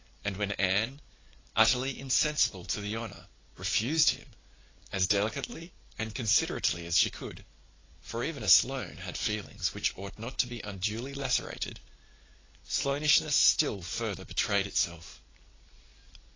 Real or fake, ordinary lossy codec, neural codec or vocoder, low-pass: real; AAC, 32 kbps; none; 7.2 kHz